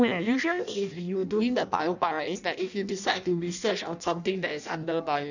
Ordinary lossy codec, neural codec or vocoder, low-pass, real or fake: none; codec, 16 kHz in and 24 kHz out, 0.6 kbps, FireRedTTS-2 codec; 7.2 kHz; fake